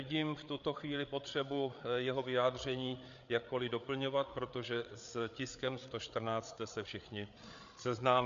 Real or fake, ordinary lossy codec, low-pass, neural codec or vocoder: fake; MP3, 64 kbps; 7.2 kHz; codec, 16 kHz, 8 kbps, FreqCodec, larger model